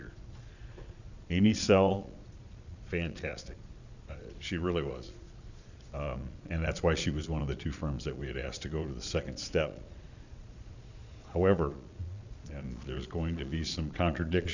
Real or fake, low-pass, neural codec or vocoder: fake; 7.2 kHz; vocoder, 22.05 kHz, 80 mel bands, Vocos